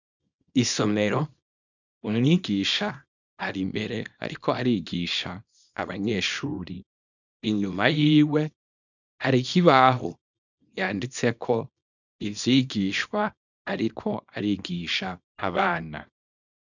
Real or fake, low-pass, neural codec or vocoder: fake; 7.2 kHz; codec, 24 kHz, 0.9 kbps, WavTokenizer, small release